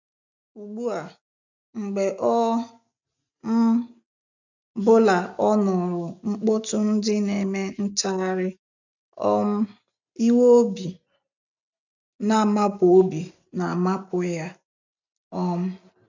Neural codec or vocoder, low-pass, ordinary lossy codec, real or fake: none; 7.2 kHz; none; real